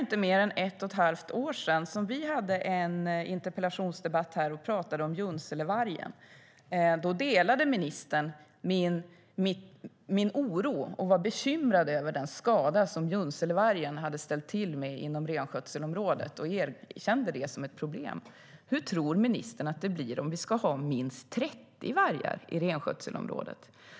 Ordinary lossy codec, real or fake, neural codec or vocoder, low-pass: none; real; none; none